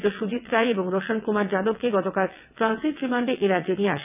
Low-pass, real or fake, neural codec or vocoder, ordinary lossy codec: 3.6 kHz; fake; vocoder, 22.05 kHz, 80 mel bands, WaveNeXt; none